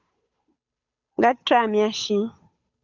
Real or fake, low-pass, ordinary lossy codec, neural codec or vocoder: fake; 7.2 kHz; Opus, 64 kbps; codec, 16 kHz, 8 kbps, FunCodec, trained on Chinese and English, 25 frames a second